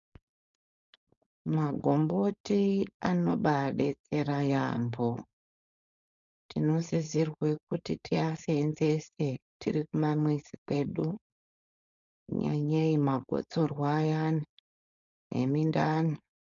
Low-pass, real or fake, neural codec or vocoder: 7.2 kHz; fake; codec, 16 kHz, 4.8 kbps, FACodec